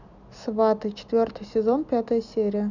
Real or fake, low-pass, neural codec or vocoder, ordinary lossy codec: real; 7.2 kHz; none; none